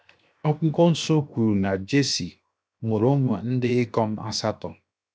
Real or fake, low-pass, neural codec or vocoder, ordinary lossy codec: fake; none; codec, 16 kHz, 0.7 kbps, FocalCodec; none